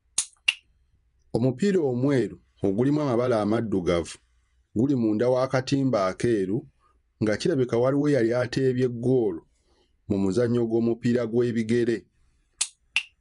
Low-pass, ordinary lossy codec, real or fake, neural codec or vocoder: 10.8 kHz; none; fake; vocoder, 24 kHz, 100 mel bands, Vocos